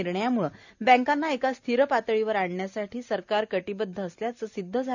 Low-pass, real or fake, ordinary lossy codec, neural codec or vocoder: 7.2 kHz; real; none; none